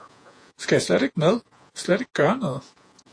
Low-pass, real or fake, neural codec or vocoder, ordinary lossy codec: 9.9 kHz; fake; vocoder, 48 kHz, 128 mel bands, Vocos; AAC, 48 kbps